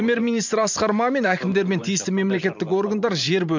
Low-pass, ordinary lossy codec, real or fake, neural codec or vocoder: 7.2 kHz; MP3, 64 kbps; fake; vocoder, 44.1 kHz, 128 mel bands every 512 samples, BigVGAN v2